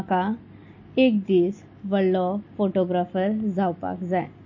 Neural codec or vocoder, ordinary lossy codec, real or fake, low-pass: none; MP3, 32 kbps; real; 7.2 kHz